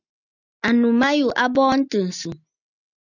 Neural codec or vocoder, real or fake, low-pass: none; real; 7.2 kHz